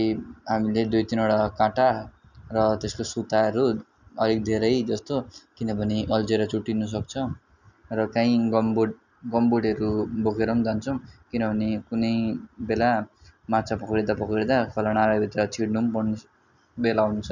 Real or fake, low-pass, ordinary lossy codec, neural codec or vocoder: real; 7.2 kHz; none; none